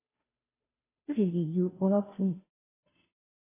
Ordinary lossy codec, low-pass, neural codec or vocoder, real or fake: AAC, 16 kbps; 3.6 kHz; codec, 16 kHz, 0.5 kbps, FunCodec, trained on Chinese and English, 25 frames a second; fake